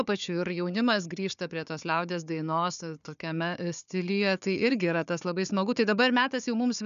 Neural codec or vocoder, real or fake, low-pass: codec, 16 kHz, 4 kbps, FunCodec, trained on Chinese and English, 50 frames a second; fake; 7.2 kHz